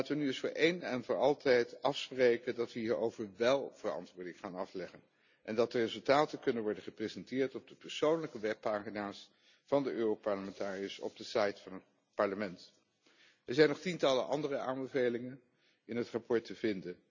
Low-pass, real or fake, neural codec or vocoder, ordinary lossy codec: 7.2 kHz; real; none; none